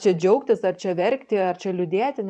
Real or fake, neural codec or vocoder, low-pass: fake; vocoder, 44.1 kHz, 128 mel bands every 512 samples, BigVGAN v2; 9.9 kHz